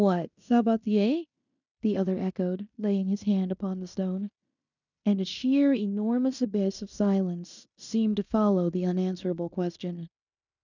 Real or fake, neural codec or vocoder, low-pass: fake; codec, 16 kHz in and 24 kHz out, 0.9 kbps, LongCat-Audio-Codec, fine tuned four codebook decoder; 7.2 kHz